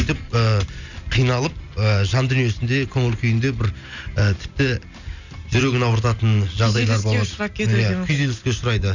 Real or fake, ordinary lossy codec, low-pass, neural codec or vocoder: real; none; 7.2 kHz; none